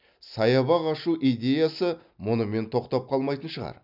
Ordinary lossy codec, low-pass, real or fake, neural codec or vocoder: none; 5.4 kHz; real; none